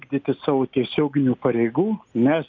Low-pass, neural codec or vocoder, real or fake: 7.2 kHz; none; real